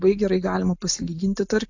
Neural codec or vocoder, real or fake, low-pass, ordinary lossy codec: none; real; 7.2 kHz; AAC, 48 kbps